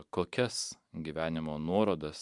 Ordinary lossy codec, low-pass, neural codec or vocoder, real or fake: MP3, 96 kbps; 10.8 kHz; vocoder, 44.1 kHz, 128 mel bands every 512 samples, BigVGAN v2; fake